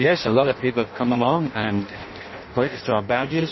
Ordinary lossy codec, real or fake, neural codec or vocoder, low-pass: MP3, 24 kbps; fake; codec, 16 kHz in and 24 kHz out, 0.6 kbps, FireRedTTS-2 codec; 7.2 kHz